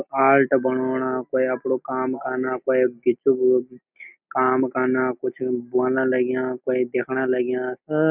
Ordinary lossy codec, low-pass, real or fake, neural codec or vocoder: none; 3.6 kHz; real; none